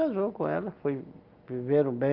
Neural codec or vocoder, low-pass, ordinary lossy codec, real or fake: none; 5.4 kHz; Opus, 32 kbps; real